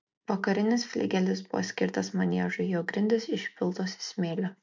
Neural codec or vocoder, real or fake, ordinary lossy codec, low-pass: none; real; MP3, 64 kbps; 7.2 kHz